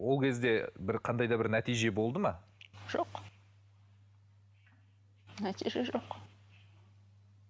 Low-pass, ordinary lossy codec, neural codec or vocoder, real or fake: none; none; none; real